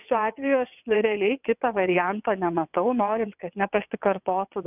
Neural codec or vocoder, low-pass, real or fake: vocoder, 22.05 kHz, 80 mel bands, WaveNeXt; 3.6 kHz; fake